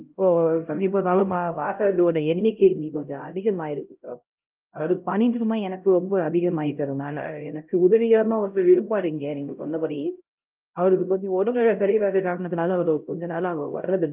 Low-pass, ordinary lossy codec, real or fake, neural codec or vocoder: 3.6 kHz; Opus, 24 kbps; fake; codec, 16 kHz, 0.5 kbps, X-Codec, HuBERT features, trained on LibriSpeech